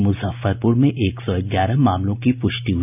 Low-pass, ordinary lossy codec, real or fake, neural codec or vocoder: 3.6 kHz; none; real; none